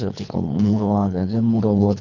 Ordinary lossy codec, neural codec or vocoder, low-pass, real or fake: none; codec, 24 kHz, 3 kbps, HILCodec; 7.2 kHz; fake